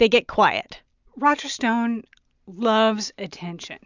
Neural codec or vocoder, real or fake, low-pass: none; real; 7.2 kHz